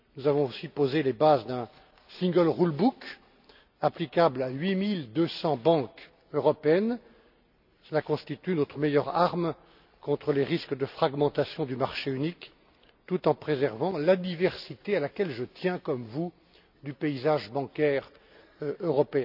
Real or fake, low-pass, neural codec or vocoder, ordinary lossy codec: real; 5.4 kHz; none; none